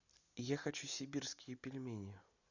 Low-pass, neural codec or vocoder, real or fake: 7.2 kHz; none; real